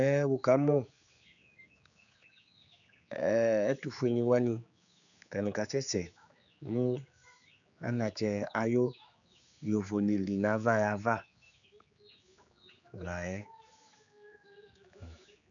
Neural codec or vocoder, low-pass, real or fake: codec, 16 kHz, 4 kbps, X-Codec, HuBERT features, trained on general audio; 7.2 kHz; fake